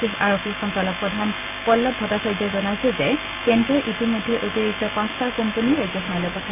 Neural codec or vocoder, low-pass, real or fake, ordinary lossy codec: none; 3.6 kHz; real; none